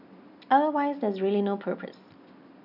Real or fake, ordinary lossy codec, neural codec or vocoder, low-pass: real; none; none; 5.4 kHz